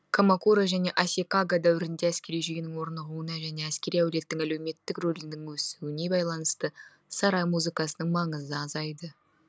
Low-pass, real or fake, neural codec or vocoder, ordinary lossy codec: none; real; none; none